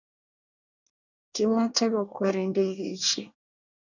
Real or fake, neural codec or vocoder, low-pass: fake; codec, 24 kHz, 1 kbps, SNAC; 7.2 kHz